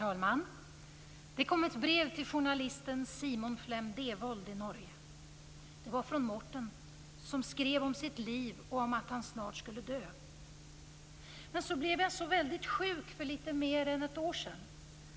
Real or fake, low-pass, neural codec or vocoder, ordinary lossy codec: real; none; none; none